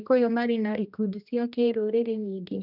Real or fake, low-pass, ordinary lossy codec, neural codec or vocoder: fake; 5.4 kHz; none; codec, 16 kHz, 1 kbps, X-Codec, HuBERT features, trained on general audio